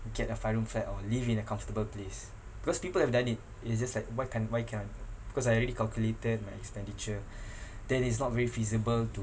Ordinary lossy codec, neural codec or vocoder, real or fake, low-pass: none; none; real; none